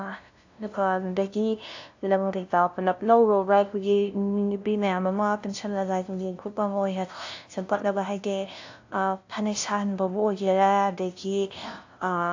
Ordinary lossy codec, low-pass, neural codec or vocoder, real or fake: AAC, 48 kbps; 7.2 kHz; codec, 16 kHz, 0.5 kbps, FunCodec, trained on LibriTTS, 25 frames a second; fake